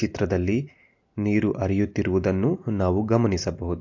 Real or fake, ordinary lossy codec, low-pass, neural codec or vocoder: real; AAC, 48 kbps; 7.2 kHz; none